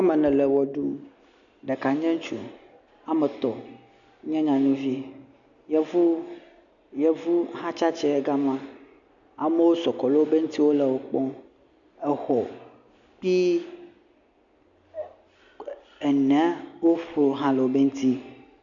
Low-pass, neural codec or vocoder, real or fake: 7.2 kHz; none; real